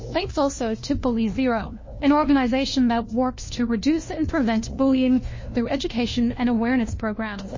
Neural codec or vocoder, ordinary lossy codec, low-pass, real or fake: codec, 16 kHz, 1 kbps, FunCodec, trained on LibriTTS, 50 frames a second; MP3, 32 kbps; 7.2 kHz; fake